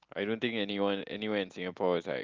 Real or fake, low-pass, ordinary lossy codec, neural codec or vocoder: real; 7.2 kHz; Opus, 32 kbps; none